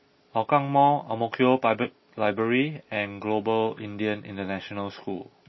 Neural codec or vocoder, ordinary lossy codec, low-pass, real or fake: none; MP3, 24 kbps; 7.2 kHz; real